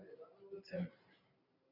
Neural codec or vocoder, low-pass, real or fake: none; 5.4 kHz; real